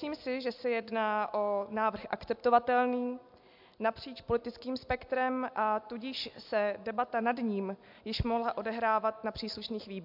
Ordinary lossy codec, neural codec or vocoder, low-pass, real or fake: MP3, 48 kbps; none; 5.4 kHz; real